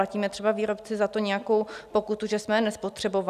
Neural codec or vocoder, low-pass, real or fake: none; 14.4 kHz; real